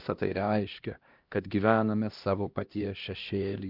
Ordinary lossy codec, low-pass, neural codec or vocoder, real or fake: Opus, 24 kbps; 5.4 kHz; codec, 16 kHz, 1 kbps, X-Codec, HuBERT features, trained on LibriSpeech; fake